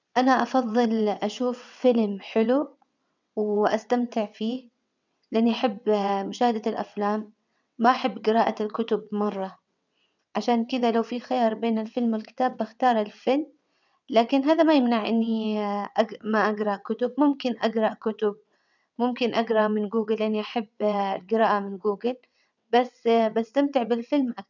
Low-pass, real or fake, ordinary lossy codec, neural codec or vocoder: 7.2 kHz; fake; none; vocoder, 22.05 kHz, 80 mel bands, WaveNeXt